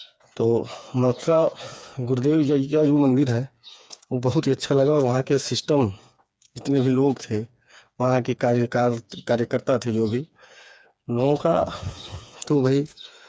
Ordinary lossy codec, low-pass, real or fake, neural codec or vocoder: none; none; fake; codec, 16 kHz, 4 kbps, FreqCodec, smaller model